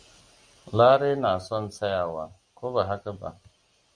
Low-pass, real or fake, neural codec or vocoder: 9.9 kHz; real; none